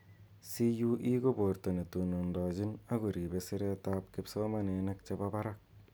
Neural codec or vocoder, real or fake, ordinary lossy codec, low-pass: none; real; none; none